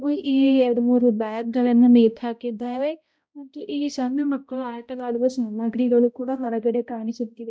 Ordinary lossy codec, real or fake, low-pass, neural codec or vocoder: none; fake; none; codec, 16 kHz, 0.5 kbps, X-Codec, HuBERT features, trained on balanced general audio